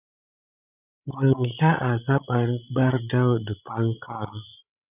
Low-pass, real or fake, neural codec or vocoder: 3.6 kHz; fake; codec, 16 kHz, 16 kbps, FreqCodec, larger model